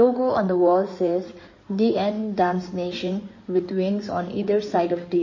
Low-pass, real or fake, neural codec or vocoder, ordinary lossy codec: 7.2 kHz; fake; codec, 16 kHz in and 24 kHz out, 2.2 kbps, FireRedTTS-2 codec; MP3, 32 kbps